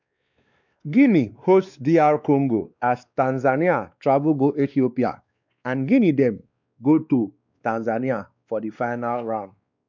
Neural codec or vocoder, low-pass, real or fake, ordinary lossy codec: codec, 16 kHz, 2 kbps, X-Codec, WavLM features, trained on Multilingual LibriSpeech; 7.2 kHz; fake; none